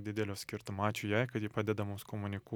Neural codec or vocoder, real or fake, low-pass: none; real; 19.8 kHz